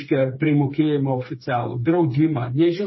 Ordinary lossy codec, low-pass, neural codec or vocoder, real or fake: MP3, 24 kbps; 7.2 kHz; codec, 16 kHz, 4 kbps, FreqCodec, smaller model; fake